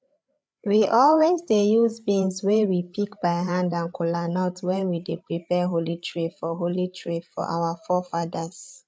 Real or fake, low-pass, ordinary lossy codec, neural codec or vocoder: fake; none; none; codec, 16 kHz, 8 kbps, FreqCodec, larger model